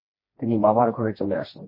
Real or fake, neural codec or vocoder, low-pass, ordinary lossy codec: fake; codec, 16 kHz, 2 kbps, FreqCodec, smaller model; 5.4 kHz; MP3, 24 kbps